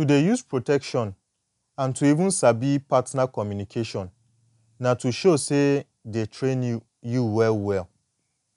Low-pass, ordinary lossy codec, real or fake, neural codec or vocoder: 10.8 kHz; none; real; none